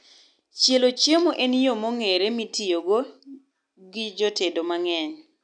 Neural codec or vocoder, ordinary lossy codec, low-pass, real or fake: none; none; 9.9 kHz; real